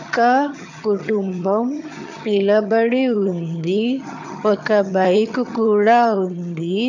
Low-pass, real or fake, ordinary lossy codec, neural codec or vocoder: 7.2 kHz; fake; none; vocoder, 22.05 kHz, 80 mel bands, HiFi-GAN